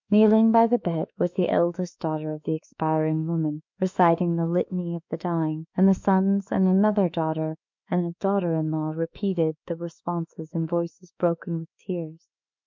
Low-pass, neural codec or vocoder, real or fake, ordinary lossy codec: 7.2 kHz; autoencoder, 48 kHz, 32 numbers a frame, DAC-VAE, trained on Japanese speech; fake; MP3, 64 kbps